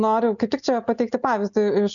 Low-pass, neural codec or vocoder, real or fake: 7.2 kHz; none; real